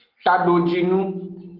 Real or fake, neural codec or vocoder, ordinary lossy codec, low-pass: real; none; Opus, 16 kbps; 5.4 kHz